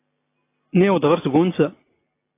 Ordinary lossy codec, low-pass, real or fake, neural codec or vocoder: AAC, 24 kbps; 3.6 kHz; real; none